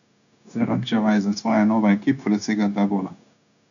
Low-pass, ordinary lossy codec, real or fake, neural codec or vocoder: 7.2 kHz; none; fake; codec, 16 kHz, 0.9 kbps, LongCat-Audio-Codec